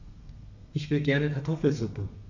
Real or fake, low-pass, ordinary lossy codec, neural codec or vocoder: fake; 7.2 kHz; none; codec, 32 kHz, 1.9 kbps, SNAC